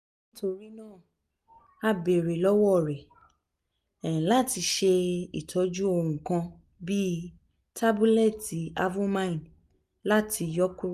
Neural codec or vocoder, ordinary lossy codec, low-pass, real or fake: none; none; 14.4 kHz; real